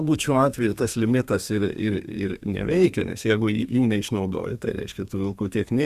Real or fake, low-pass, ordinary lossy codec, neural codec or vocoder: fake; 14.4 kHz; Opus, 64 kbps; codec, 32 kHz, 1.9 kbps, SNAC